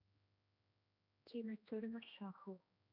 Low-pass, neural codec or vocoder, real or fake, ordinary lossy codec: 5.4 kHz; codec, 16 kHz, 1 kbps, X-Codec, HuBERT features, trained on general audio; fake; none